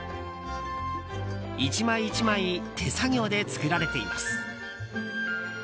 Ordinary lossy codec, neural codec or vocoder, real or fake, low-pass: none; none; real; none